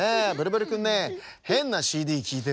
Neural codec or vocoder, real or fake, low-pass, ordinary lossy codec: none; real; none; none